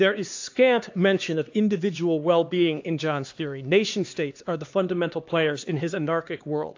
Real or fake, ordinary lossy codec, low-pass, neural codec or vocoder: fake; AAC, 48 kbps; 7.2 kHz; codec, 16 kHz, 2 kbps, X-Codec, HuBERT features, trained on LibriSpeech